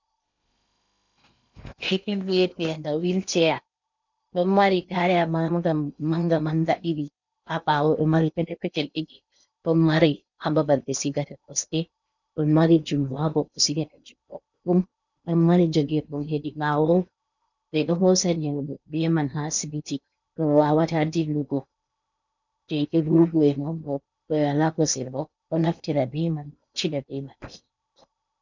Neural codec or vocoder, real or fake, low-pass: codec, 16 kHz in and 24 kHz out, 0.8 kbps, FocalCodec, streaming, 65536 codes; fake; 7.2 kHz